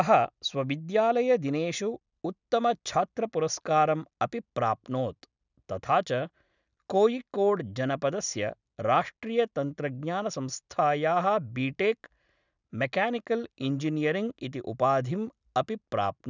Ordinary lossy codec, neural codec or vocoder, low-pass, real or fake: none; none; 7.2 kHz; real